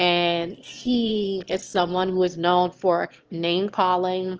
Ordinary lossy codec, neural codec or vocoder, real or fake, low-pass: Opus, 16 kbps; autoencoder, 22.05 kHz, a latent of 192 numbers a frame, VITS, trained on one speaker; fake; 7.2 kHz